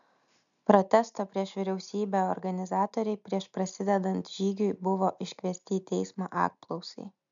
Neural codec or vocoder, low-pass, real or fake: none; 7.2 kHz; real